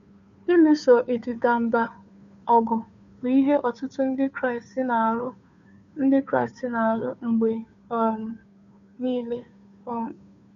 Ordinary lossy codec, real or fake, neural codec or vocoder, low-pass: none; fake; codec, 16 kHz, 2 kbps, FunCodec, trained on Chinese and English, 25 frames a second; 7.2 kHz